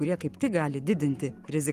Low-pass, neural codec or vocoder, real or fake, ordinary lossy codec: 14.4 kHz; codec, 44.1 kHz, 7.8 kbps, Pupu-Codec; fake; Opus, 32 kbps